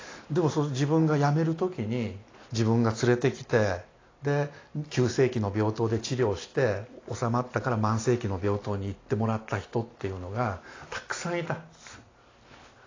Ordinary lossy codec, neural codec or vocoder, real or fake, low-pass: AAC, 32 kbps; none; real; 7.2 kHz